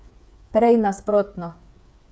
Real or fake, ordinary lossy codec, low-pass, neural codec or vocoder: fake; none; none; codec, 16 kHz, 8 kbps, FreqCodec, smaller model